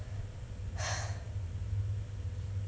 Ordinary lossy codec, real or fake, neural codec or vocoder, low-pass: none; real; none; none